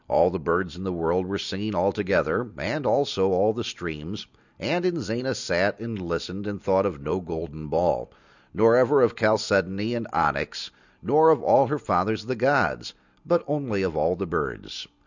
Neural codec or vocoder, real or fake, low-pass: none; real; 7.2 kHz